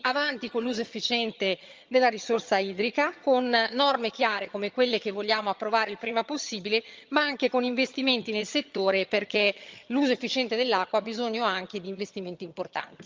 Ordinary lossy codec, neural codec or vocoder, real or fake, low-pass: Opus, 24 kbps; vocoder, 22.05 kHz, 80 mel bands, HiFi-GAN; fake; 7.2 kHz